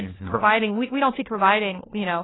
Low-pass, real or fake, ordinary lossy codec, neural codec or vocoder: 7.2 kHz; fake; AAC, 16 kbps; codec, 16 kHz, 1 kbps, FunCodec, trained on LibriTTS, 50 frames a second